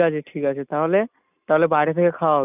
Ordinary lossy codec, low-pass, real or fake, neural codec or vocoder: none; 3.6 kHz; real; none